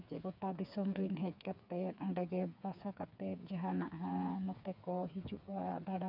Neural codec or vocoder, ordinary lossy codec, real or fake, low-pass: codec, 16 kHz, 4 kbps, FreqCodec, smaller model; none; fake; 5.4 kHz